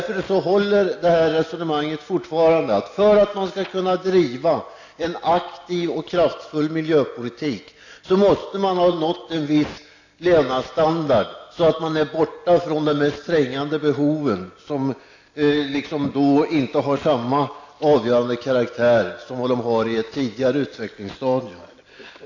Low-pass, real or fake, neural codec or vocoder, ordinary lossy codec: 7.2 kHz; real; none; AAC, 48 kbps